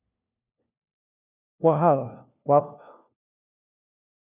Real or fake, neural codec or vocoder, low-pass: fake; codec, 16 kHz, 1 kbps, FunCodec, trained on LibriTTS, 50 frames a second; 3.6 kHz